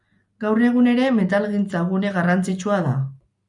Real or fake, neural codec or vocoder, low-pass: real; none; 10.8 kHz